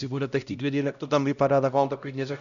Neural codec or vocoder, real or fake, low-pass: codec, 16 kHz, 0.5 kbps, X-Codec, HuBERT features, trained on LibriSpeech; fake; 7.2 kHz